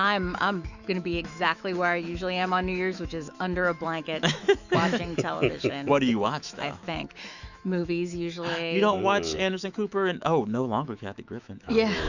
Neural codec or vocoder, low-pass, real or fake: autoencoder, 48 kHz, 128 numbers a frame, DAC-VAE, trained on Japanese speech; 7.2 kHz; fake